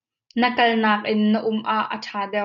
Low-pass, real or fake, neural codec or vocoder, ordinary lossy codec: 7.2 kHz; real; none; MP3, 96 kbps